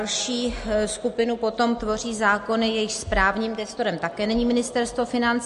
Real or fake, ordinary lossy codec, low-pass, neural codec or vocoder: real; MP3, 48 kbps; 14.4 kHz; none